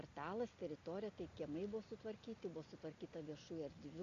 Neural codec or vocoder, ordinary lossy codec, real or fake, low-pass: none; Opus, 64 kbps; real; 7.2 kHz